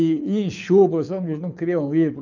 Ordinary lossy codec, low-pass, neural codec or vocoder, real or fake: none; 7.2 kHz; codec, 24 kHz, 6 kbps, HILCodec; fake